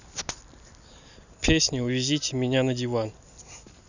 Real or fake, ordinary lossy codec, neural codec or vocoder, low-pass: real; none; none; 7.2 kHz